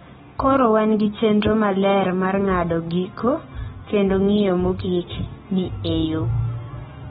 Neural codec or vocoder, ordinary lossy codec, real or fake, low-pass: none; AAC, 16 kbps; real; 10.8 kHz